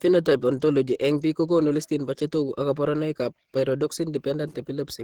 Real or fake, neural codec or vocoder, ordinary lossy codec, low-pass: fake; codec, 44.1 kHz, 7.8 kbps, DAC; Opus, 16 kbps; 19.8 kHz